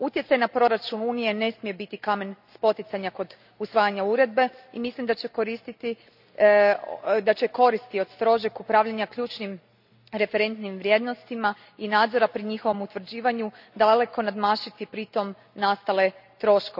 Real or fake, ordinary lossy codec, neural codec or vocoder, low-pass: real; none; none; 5.4 kHz